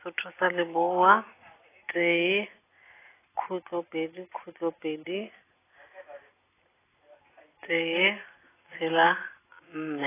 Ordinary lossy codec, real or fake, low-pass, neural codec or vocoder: AAC, 16 kbps; real; 3.6 kHz; none